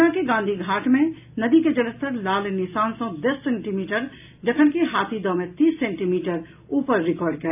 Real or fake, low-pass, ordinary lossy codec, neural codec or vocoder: real; 3.6 kHz; AAC, 32 kbps; none